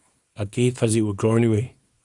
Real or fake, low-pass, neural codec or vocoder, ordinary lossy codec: fake; 10.8 kHz; codec, 24 kHz, 0.9 kbps, WavTokenizer, small release; Opus, 64 kbps